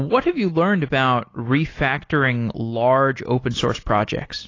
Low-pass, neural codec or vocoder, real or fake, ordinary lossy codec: 7.2 kHz; none; real; AAC, 32 kbps